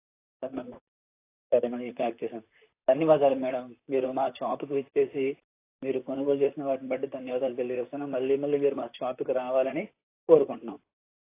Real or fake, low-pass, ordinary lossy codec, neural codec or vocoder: fake; 3.6 kHz; AAC, 24 kbps; vocoder, 44.1 kHz, 128 mel bands, Pupu-Vocoder